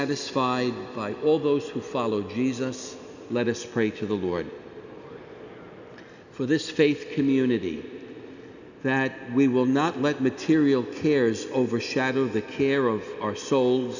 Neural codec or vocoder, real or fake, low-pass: none; real; 7.2 kHz